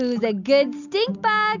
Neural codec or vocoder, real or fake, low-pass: none; real; 7.2 kHz